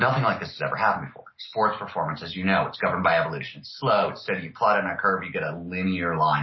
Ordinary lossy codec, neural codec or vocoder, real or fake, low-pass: MP3, 24 kbps; none; real; 7.2 kHz